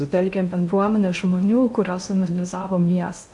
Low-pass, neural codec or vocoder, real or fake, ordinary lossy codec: 10.8 kHz; codec, 16 kHz in and 24 kHz out, 0.8 kbps, FocalCodec, streaming, 65536 codes; fake; MP3, 64 kbps